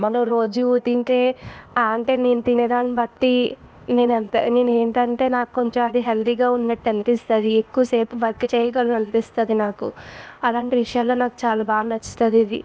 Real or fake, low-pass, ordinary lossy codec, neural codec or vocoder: fake; none; none; codec, 16 kHz, 0.8 kbps, ZipCodec